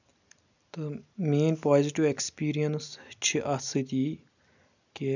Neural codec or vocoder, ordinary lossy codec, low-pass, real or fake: none; none; 7.2 kHz; real